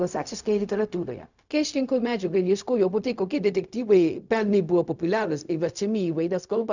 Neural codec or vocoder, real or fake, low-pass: codec, 16 kHz, 0.4 kbps, LongCat-Audio-Codec; fake; 7.2 kHz